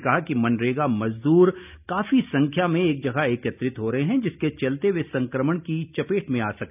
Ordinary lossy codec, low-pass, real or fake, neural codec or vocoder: none; 3.6 kHz; real; none